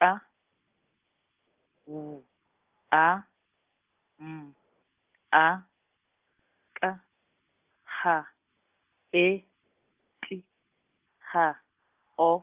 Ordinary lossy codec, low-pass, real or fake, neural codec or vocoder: Opus, 24 kbps; 3.6 kHz; real; none